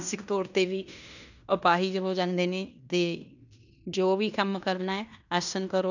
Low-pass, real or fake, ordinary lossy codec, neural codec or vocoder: 7.2 kHz; fake; none; codec, 16 kHz in and 24 kHz out, 0.9 kbps, LongCat-Audio-Codec, fine tuned four codebook decoder